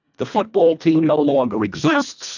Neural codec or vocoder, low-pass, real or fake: codec, 24 kHz, 1.5 kbps, HILCodec; 7.2 kHz; fake